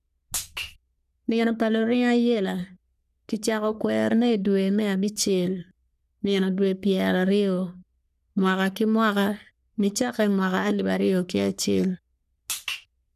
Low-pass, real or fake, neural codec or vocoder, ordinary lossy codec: 14.4 kHz; fake; codec, 44.1 kHz, 3.4 kbps, Pupu-Codec; none